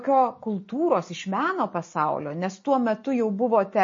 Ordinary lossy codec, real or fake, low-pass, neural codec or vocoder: MP3, 32 kbps; real; 7.2 kHz; none